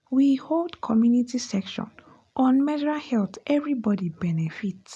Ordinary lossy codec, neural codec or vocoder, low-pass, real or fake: none; none; none; real